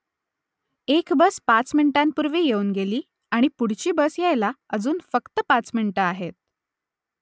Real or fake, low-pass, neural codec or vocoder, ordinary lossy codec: real; none; none; none